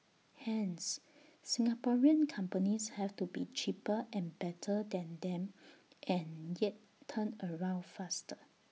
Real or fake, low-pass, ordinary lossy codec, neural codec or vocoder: real; none; none; none